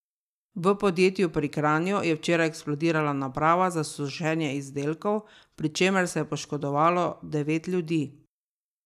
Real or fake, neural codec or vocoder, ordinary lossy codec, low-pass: real; none; none; 14.4 kHz